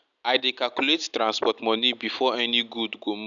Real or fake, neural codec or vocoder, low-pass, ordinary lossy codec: real; none; 7.2 kHz; none